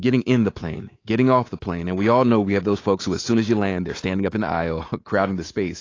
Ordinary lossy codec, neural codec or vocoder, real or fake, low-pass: AAC, 32 kbps; codec, 24 kHz, 3.1 kbps, DualCodec; fake; 7.2 kHz